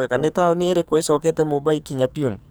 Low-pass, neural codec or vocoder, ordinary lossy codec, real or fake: none; codec, 44.1 kHz, 2.6 kbps, SNAC; none; fake